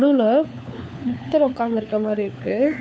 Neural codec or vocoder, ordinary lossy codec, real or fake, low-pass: codec, 16 kHz, 4 kbps, FunCodec, trained on LibriTTS, 50 frames a second; none; fake; none